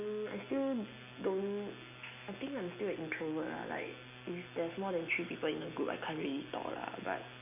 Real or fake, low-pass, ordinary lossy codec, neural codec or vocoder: real; 3.6 kHz; none; none